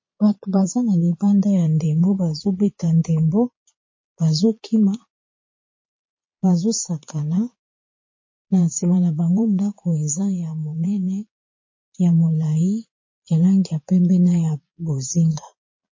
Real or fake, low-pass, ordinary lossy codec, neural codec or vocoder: fake; 7.2 kHz; MP3, 32 kbps; vocoder, 22.05 kHz, 80 mel bands, Vocos